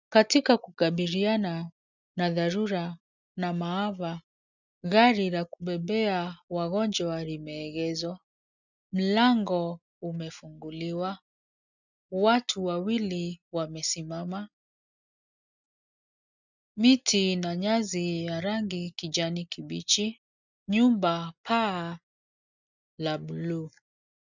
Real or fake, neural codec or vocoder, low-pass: real; none; 7.2 kHz